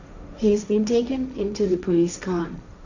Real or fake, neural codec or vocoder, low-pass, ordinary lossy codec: fake; codec, 16 kHz, 1.1 kbps, Voila-Tokenizer; 7.2 kHz; none